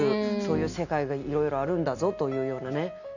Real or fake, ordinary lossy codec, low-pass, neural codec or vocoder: real; none; 7.2 kHz; none